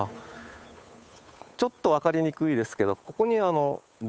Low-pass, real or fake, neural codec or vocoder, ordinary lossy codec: none; fake; codec, 16 kHz, 8 kbps, FunCodec, trained on Chinese and English, 25 frames a second; none